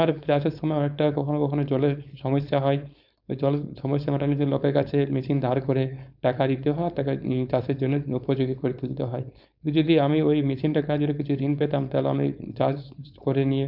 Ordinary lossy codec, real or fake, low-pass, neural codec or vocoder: none; fake; 5.4 kHz; codec, 16 kHz, 4.8 kbps, FACodec